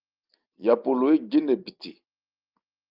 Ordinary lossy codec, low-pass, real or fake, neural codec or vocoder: Opus, 24 kbps; 5.4 kHz; real; none